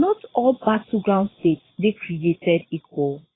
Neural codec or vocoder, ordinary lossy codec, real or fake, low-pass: vocoder, 22.05 kHz, 80 mel bands, Vocos; AAC, 16 kbps; fake; 7.2 kHz